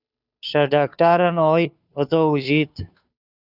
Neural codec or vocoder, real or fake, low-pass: codec, 16 kHz, 2 kbps, FunCodec, trained on Chinese and English, 25 frames a second; fake; 5.4 kHz